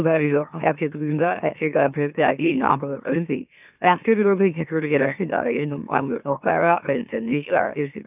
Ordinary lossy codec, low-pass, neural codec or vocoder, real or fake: none; 3.6 kHz; autoencoder, 44.1 kHz, a latent of 192 numbers a frame, MeloTTS; fake